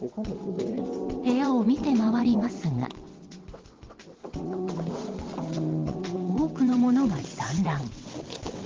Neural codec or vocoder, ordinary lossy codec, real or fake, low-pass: vocoder, 22.05 kHz, 80 mel bands, WaveNeXt; Opus, 16 kbps; fake; 7.2 kHz